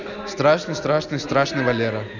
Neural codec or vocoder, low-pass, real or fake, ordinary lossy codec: none; 7.2 kHz; real; none